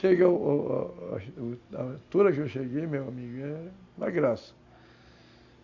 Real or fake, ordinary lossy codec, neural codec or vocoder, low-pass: real; AAC, 48 kbps; none; 7.2 kHz